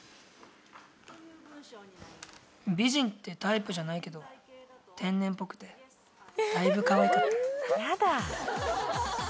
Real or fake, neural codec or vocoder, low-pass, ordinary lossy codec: real; none; none; none